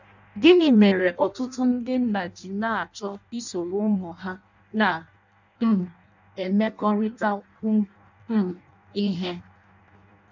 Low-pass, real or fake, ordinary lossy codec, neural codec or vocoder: 7.2 kHz; fake; none; codec, 16 kHz in and 24 kHz out, 0.6 kbps, FireRedTTS-2 codec